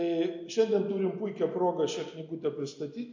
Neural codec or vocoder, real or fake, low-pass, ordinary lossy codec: none; real; 7.2 kHz; MP3, 48 kbps